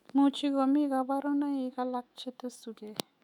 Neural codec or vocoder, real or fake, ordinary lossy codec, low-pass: autoencoder, 48 kHz, 128 numbers a frame, DAC-VAE, trained on Japanese speech; fake; none; 19.8 kHz